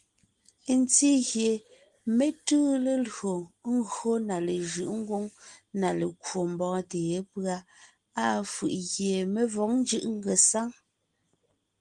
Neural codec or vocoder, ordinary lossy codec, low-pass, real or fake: none; Opus, 24 kbps; 10.8 kHz; real